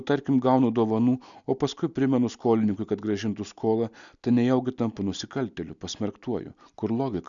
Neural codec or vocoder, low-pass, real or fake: none; 7.2 kHz; real